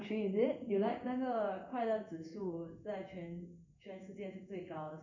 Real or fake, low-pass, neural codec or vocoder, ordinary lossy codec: fake; 7.2 kHz; codec, 16 kHz in and 24 kHz out, 1 kbps, XY-Tokenizer; none